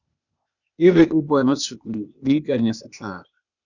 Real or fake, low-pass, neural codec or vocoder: fake; 7.2 kHz; codec, 16 kHz, 0.8 kbps, ZipCodec